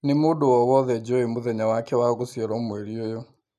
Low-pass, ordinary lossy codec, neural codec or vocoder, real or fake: 14.4 kHz; none; none; real